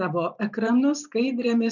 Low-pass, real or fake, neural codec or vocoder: 7.2 kHz; fake; vocoder, 44.1 kHz, 128 mel bands every 512 samples, BigVGAN v2